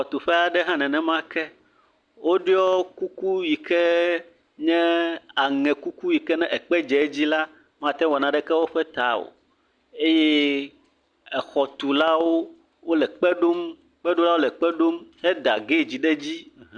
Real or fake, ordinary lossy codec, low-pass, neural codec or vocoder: real; Opus, 64 kbps; 9.9 kHz; none